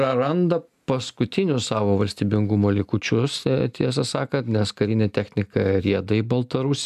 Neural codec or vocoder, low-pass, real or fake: none; 14.4 kHz; real